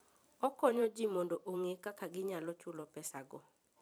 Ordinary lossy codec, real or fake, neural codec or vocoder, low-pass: none; fake; vocoder, 44.1 kHz, 128 mel bands every 512 samples, BigVGAN v2; none